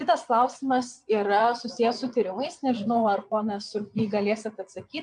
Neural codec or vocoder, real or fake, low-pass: vocoder, 22.05 kHz, 80 mel bands, Vocos; fake; 9.9 kHz